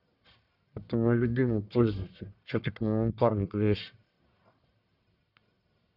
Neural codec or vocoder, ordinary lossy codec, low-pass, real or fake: codec, 44.1 kHz, 1.7 kbps, Pupu-Codec; none; 5.4 kHz; fake